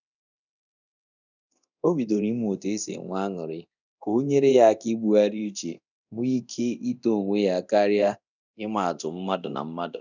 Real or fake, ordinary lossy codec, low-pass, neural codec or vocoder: fake; none; 7.2 kHz; codec, 24 kHz, 0.9 kbps, DualCodec